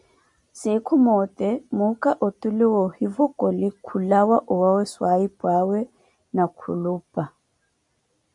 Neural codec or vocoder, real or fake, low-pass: none; real; 10.8 kHz